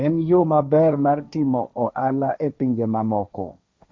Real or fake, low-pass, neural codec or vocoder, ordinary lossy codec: fake; none; codec, 16 kHz, 1.1 kbps, Voila-Tokenizer; none